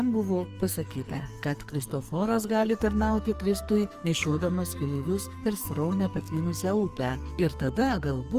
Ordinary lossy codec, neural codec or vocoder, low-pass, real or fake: Opus, 32 kbps; codec, 44.1 kHz, 2.6 kbps, SNAC; 14.4 kHz; fake